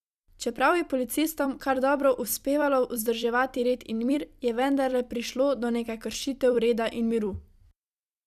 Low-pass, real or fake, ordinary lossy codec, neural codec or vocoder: 14.4 kHz; fake; none; vocoder, 44.1 kHz, 128 mel bands every 256 samples, BigVGAN v2